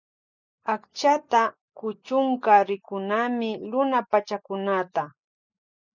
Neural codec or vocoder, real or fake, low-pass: none; real; 7.2 kHz